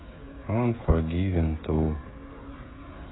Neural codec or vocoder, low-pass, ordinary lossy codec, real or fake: codec, 16 kHz, 16 kbps, FreqCodec, smaller model; 7.2 kHz; AAC, 16 kbps; fake